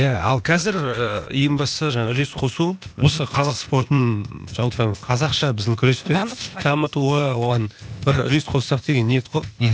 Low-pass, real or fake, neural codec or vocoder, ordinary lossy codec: none; fake; codec, 16 kHz, 0.8 kbps, ZipCodec; none